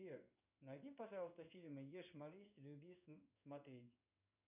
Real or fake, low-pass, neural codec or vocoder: fake; 3.6 kHz; codec, 16 kHz in and 24 kHz out, 1 kbps, XY-Tokenizer